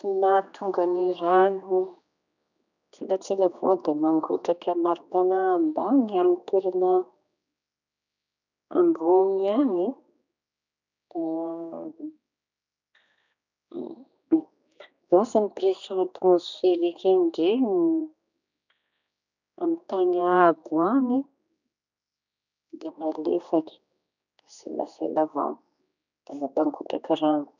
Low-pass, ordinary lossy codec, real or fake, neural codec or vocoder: 7.2 kHz; none; fake; codec, 16 kHz, 2 kbps, X-Codec, HuBERT features, trained on general audio